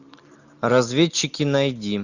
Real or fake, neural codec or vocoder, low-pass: real; none; 7.2 kHz